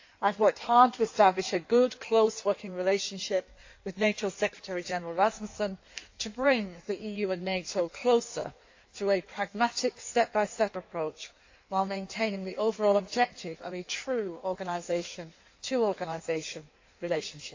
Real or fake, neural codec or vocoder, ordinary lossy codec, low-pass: fake; codec, 16 kHz in and 24 kHz out, 1.1 kbps, FireRedTTS-2 codec; AAC, 48 kbps; 7.2 kHz